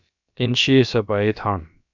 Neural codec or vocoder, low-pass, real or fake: codec, 16 kHz, about 1 kbps, DyCAST, with the encoder's durations; 7.2 kHz; fake